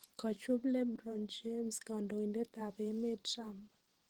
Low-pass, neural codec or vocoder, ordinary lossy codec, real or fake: 19.8 kHz; vocoder, 44.1 kHz, 128 mel bands every 512 samples, BigVGAN v2; Opus, 16 kbps; fake